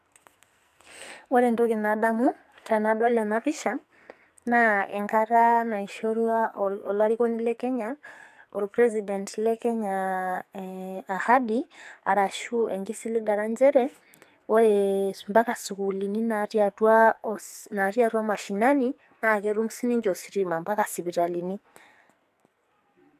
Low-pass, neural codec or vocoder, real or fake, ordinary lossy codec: 14.4 kHz; codec, 44.1 kHz, 2.6 kbps, SNAC; fake; AAC, 96 kbps